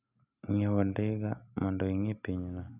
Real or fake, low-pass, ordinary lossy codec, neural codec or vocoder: real; 3.6 kHz; none; none